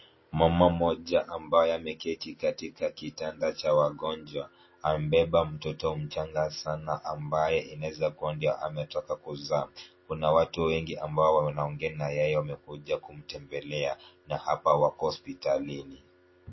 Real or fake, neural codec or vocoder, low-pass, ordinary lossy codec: real; none; 7.2 kHz; MP3, 24 kbps